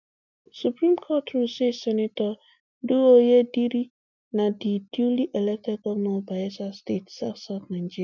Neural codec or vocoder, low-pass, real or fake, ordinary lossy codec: none; 7.2 kHz; real; none